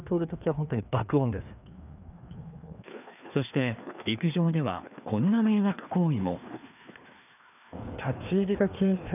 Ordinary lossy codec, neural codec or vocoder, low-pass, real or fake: none; codec, 16 kHz, 2 kbps, FreqCodec, larger model; 3.6 kHz; fake